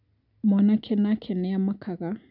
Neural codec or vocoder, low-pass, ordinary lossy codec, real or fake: none; 5.4 kHz; none; real